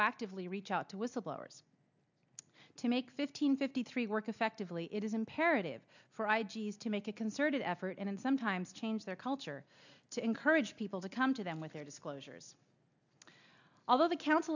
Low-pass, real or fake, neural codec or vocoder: 7.2 kHz; real; none